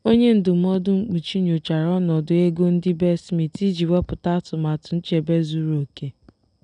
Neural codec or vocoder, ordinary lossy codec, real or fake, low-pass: none; none; real; 9.9 kHz